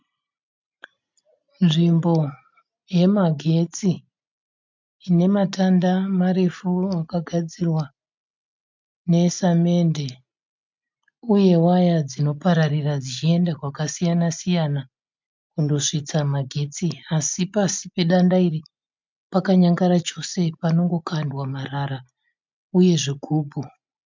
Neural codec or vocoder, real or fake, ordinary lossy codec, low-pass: none; real; MP3, 64 kbps; 7.2 kHz